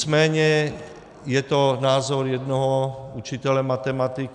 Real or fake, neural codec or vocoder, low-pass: real; none; 10.8 kHz